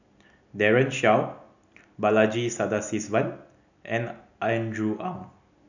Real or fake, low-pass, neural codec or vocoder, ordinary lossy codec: real; 7.2 kHz; none; none